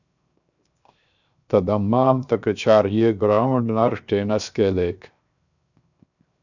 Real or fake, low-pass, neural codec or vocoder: fake; 7.2 kHz; codec, 16 kHz, 0.7 kbps, FocalCodec